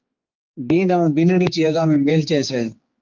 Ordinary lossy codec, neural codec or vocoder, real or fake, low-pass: Opus, 32 kbps; codec, 16 kHz, 4 kbps, X-Codec, HuBERT features, trained on general audio; fake; 7.2 kHz